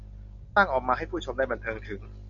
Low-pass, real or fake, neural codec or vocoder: 7.2 kHz; real; none